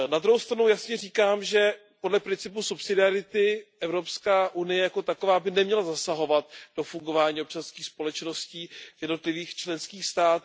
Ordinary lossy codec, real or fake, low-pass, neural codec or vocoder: none; real; none; none